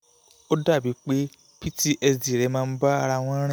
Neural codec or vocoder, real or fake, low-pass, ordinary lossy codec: none; real; none; none